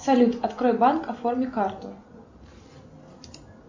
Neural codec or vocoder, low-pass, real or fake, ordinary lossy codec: none; 7.2 kHz; real; MP3, 48 kbps